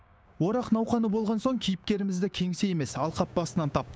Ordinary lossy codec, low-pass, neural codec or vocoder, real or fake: none; none; codec, 16 kHz, 6 kbps, DAC; fake